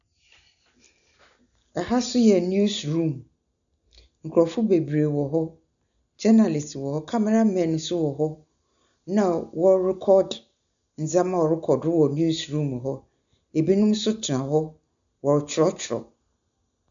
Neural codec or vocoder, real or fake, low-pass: none; real; 7.2 kHz